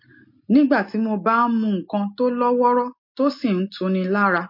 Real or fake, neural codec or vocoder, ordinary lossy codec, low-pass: real; none; MP3, 32 kbps; 5.4 kHz